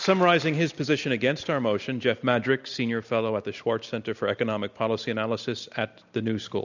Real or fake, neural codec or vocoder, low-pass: real; none; 7.2 kHz